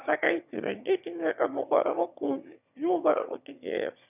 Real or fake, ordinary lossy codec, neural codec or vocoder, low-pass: fake; none; autoencoder, 22.05 kHz, a latent of 192 numbers a frame, VITS, trained on one speaker; 3.6 kHz